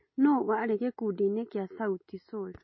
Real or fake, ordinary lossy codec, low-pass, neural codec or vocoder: real; MP3, 24 kbps; 7.2 kHz; none